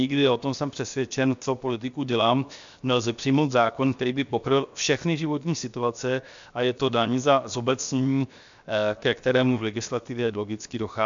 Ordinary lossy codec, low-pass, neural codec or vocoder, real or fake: MP3, 64 kbps; 7.2 kHz; codec, 16 kHz, 0.7 kbps, FocalCodec; fake